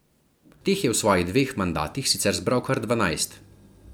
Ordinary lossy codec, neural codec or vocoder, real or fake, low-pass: none; none; real; none